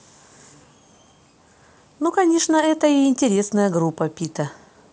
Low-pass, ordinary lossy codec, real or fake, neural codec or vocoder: none; none; real; none